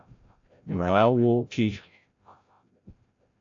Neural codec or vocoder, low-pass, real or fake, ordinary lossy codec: codec, 16 kHz, 0.5 kbps, FreqCodec, larger model; 7.2 kHz; fake; AAC, 48 kbps